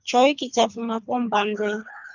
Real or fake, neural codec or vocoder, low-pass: fake; codec, 24 kHz, 3 kbps, HILCodec; 7.2 kHz